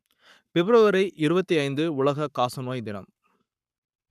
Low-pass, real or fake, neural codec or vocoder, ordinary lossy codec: 14.4 kHz; fake; codec, 44.1 kHz, 7.8 kbps, Pupu-Codec; none